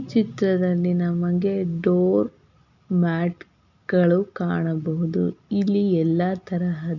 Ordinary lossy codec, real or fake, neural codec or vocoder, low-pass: none; real; none; 7.2 kHz